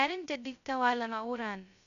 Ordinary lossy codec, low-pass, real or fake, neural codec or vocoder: none; 7.2 kHz; fake; codec, 16 kHz, 0.2 kbps, FocalCodec